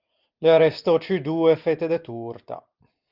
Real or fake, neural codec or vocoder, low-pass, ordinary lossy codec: real; none; 5.4 kHz; Opus, 32 kbps